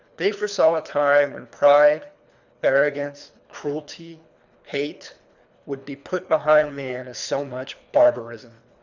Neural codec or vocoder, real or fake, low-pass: codec, 24 kHz, 3 kbps, HILCodec; fake; 7.2 kHz